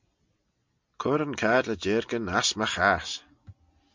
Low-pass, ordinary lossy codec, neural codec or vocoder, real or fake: 7.2 kHz; MP3, 64 kbps; none; real